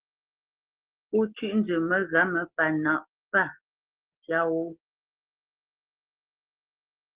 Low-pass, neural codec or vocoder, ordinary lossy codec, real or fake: 3.6 kHz; none; Opus, 16 kbps; real